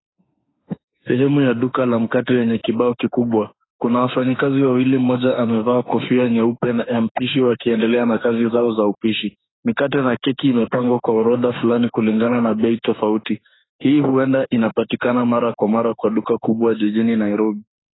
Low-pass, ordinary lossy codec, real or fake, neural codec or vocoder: 7.2 kHz; AAC, 16 kbps; fake; autoencoder, 48 kHz, 32 numbers a frame, DAC-VAE, trained on Japanese speech